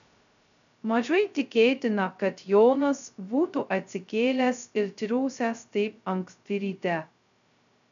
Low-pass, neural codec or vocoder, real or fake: 7.2 kHz; codec, 16 kHz, 0.2 kbps, FocalCodec; fake